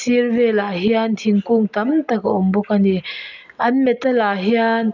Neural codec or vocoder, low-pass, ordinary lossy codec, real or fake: none; 7.2 kHz; none; real